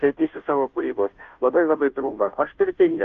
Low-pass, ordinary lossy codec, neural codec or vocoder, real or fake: 7.2 kHz; Opus, 24 kbps; codec, 16 kHz, 0.5 kbps, FunCodec, trained on Chinese and English, 25 frames a second; fake